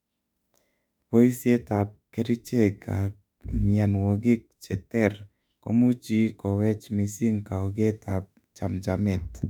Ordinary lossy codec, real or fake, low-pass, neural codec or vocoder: none; fake; 19.8 kHz; autoencoder, 48 kHz, 32 numbers a frame, DAC-VAE, trained on Japanese speech